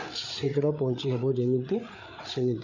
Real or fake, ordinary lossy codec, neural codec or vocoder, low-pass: fake; none; codec, 16 kHz, 8 kbps, FreqCodec, larger model; 7.2 kHz